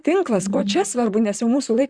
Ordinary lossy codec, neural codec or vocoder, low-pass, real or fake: Opus, 64 kbps; vocoder, 44.1 kHz, 128 mel bands, Pupu-Vocoder; 9.9 kHz; fake